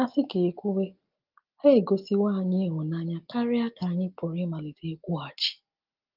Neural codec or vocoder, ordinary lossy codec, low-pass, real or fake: none; Opus, 32 kbps; 5.4 kHz; real